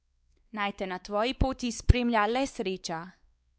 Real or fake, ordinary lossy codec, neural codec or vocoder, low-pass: fake; none; codec, 16 kHz, 4 kbps, X-Codec, WavLM features, trained on Multilingual LibriSpeech; none